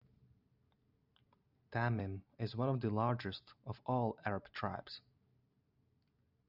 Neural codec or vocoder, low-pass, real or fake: none; 5.4 kHz; real